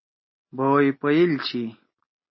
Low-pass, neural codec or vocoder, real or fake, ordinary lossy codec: 7.2 kHz; none; real; MP3, 24 kbps